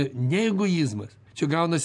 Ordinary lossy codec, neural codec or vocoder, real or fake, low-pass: MP3, 96 kbps; none; real; 10.8 kHz